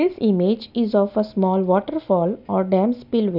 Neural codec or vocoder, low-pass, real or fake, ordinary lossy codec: none; 5.4 kHz; real; none